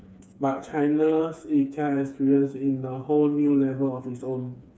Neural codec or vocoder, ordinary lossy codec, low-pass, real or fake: codec, 16 kHz, 4 kbps, FreqCodec, smaller model; none; none; fake